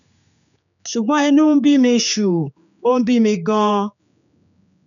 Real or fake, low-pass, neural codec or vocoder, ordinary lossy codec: fake; 7.2 kHz; codec, 16 kHz, 4 kbps, X-Codec, HuBERT features, trained on general audio; none